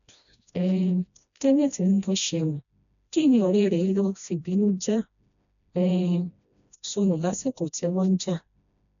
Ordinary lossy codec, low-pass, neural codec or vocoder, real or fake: none; 7.2 kHz; codec, 16 kHz, 1 kbps, FreqCodec, smaller model; fake